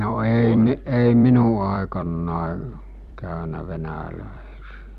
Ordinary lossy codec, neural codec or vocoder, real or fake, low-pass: none; vocoder, 44.1 kHz, 128 mel bands every 256 samples, BigVGAN v2; fake; 14.4 kHz